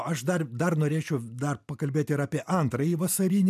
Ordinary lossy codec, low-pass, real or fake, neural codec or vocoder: MP3, 96 kbps; 14.4 kHz; real; none